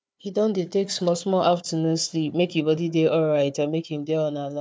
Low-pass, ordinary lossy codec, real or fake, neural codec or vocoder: none; none; fake; codec, 16 kHz, 4 kbps, FunCodec, trained on Chinese and English, 50 frames a second